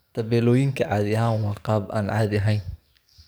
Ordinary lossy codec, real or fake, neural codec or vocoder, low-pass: none; fake; codec, 44.1 kHz, 7.8 kbps, DAC; none